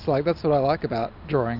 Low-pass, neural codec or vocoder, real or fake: 5.4 kHz; none; real